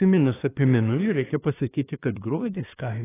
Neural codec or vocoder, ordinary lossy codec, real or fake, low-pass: codec, 24 kHz, 1 kbps, SNAC; AAC, 16 kbps; fake; 3.6 kHz